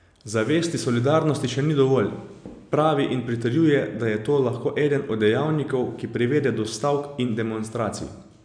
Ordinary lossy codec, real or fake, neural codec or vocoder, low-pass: none; real; none; 9.9 kHz